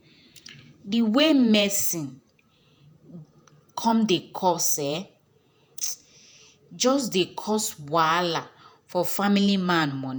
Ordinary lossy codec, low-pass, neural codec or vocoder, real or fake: none; none; vocoder, 48 kHz, 128 mel bands, Vocos; fake